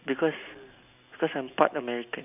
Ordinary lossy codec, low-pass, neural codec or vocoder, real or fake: none; 3.6 kHz; none; real